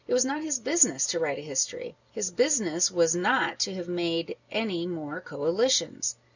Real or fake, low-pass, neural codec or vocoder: real; 7.2 kHz; none